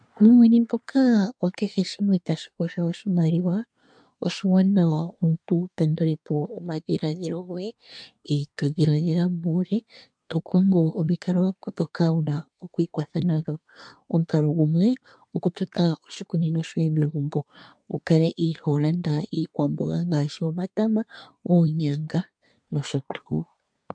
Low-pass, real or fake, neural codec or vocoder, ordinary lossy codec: 9.9 kHz; fake; codec, 24 kHz, 1 kbps, SNAC; MP3, 64 kbps